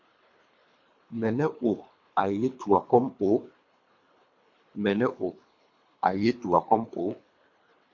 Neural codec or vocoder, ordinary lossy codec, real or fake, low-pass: codec, 24 kHz, 3 kbps, HILCodec; MP3, 48 kbps; fake; 7.2 kHz